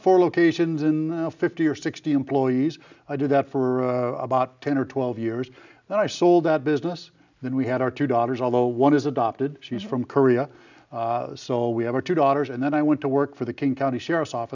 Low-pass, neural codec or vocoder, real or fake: 7.2 kHz; none; real